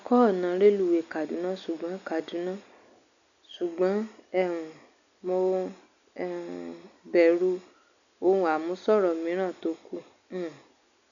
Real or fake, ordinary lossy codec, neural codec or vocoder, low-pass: real; none; none; 7.2 kHz